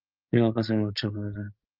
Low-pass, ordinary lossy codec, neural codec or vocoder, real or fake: 5.4 kHz; Opus, 24 kbps; codec, 16 kHz, 16 kbps, FreqCodec, larger model; fake